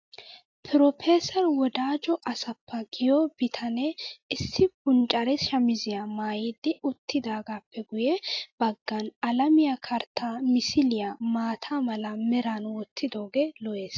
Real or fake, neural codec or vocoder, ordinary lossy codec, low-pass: real; none; AAC, 48 kbps; 7.2 kHz